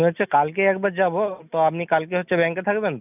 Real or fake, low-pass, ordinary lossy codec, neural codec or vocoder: real; 3.6 kHz; none; none